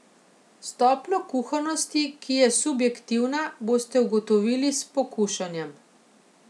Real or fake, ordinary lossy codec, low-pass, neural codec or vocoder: real; none; none; none